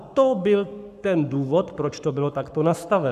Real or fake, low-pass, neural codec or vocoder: fake; 14.4 kHz; codec, 44.1 kHz, 7.8 kbps, Pupu-Codec